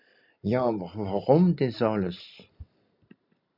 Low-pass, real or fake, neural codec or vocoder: 5.4 kHz; real; none